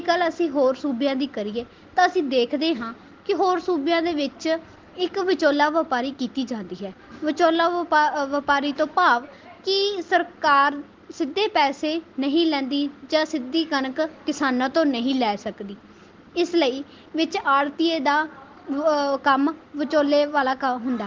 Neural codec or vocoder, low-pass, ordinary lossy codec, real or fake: none; 7.2 kHz; Opus, 16 kbps; real